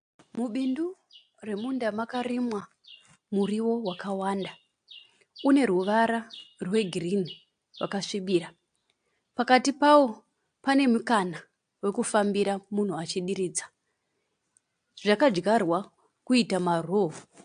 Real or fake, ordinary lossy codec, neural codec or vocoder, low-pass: real; AAC, 64 kbps; none; 9.9 kHz